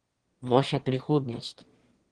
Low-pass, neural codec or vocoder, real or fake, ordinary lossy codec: 9.9 kHz; autoencoder, 22.05 kHz, a latent of 192 numbers a frame, VITS, trained on one speaker; fake; Opus, 16 kbps